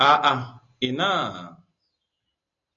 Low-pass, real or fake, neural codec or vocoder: 7.2 kHz; real; none